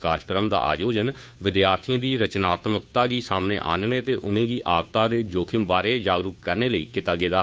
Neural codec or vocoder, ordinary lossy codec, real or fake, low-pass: codec, 16 kHz, 2 kbps, FunCodec, trained on Chinese and English, 25 frames a second; none; fake; none